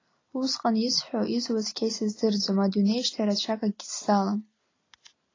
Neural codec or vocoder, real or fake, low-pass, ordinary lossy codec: none; real; 7.2 kHz; AAC, 32 kbps